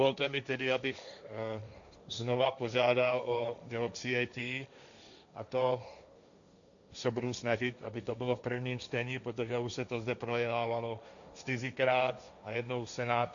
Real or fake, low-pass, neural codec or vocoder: fake; 7.2 kHz; codec, 16 kHz, 1.1 kbps, Voila-Tokenizer